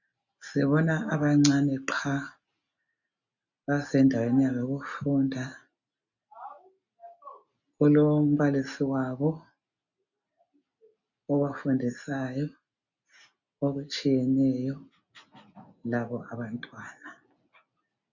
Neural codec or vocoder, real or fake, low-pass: none; real; 7.2 kHz